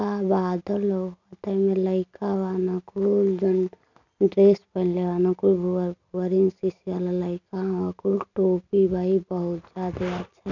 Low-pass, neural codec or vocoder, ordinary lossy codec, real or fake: 7.2 kHz; none; none; real